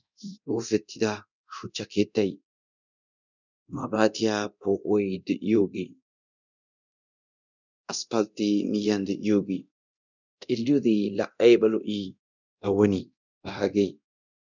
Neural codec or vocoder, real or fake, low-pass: codec, 24 kHz, 0.9 kbps, DualCodec; fake; 7.2 kHz